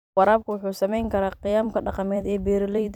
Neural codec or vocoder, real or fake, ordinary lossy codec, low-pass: vocoder, 44.1 kHz, 128 mel bands every 256 samples, BigVGAN v2; fake; none; 19.8 kHz